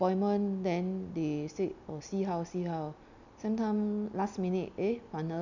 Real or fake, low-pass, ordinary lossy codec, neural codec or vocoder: real; 7.2 kHz; none; none